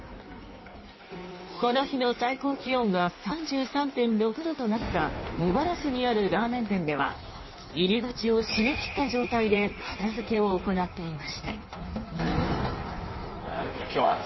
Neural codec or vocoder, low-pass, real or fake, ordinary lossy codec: codec, 16 kHz in and 24 kHz out, 1.1 kbps, FireRedTTS-2 codec; 7.2 kHz; fake; MP3, 24 kbps